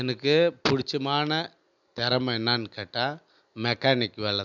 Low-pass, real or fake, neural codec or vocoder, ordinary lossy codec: 7.2 kHz; real; none; none